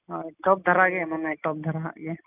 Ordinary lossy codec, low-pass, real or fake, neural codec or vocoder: none; 3.6 kHz; real; none